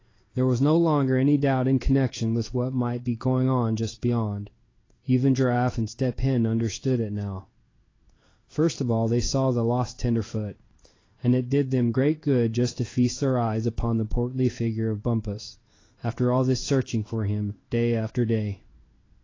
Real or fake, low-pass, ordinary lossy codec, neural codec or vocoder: fake; 7.2 kHz; AAC, 32 kbps; codec, 16 kHz in and 24 kHz out, 1 kbps, XY-Tokenizer